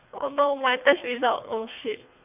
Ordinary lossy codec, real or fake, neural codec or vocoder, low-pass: none; fake; codec, 24 kHz, 3 kbps, HILCodec; 3.6 kHz